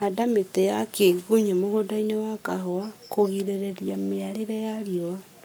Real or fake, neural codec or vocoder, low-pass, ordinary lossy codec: fake; codec, 44.1 kHz, 7.8 kbps, Pupu-Codec; none; none